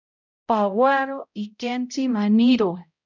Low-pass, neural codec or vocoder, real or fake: 7.2 kHz; codec, 16 kHz, 0.5 kbps, X-Codec, HuBERT features, trained on balanced general audio; fake